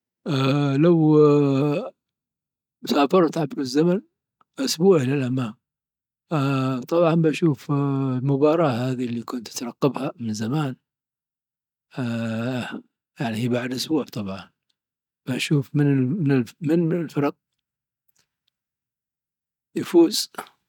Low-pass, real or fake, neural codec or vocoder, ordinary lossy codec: 19.8 kHz; real; none; none